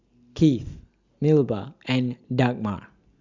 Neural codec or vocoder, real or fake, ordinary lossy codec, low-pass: none; real; Opus, 64 kbps; 7.2 kHz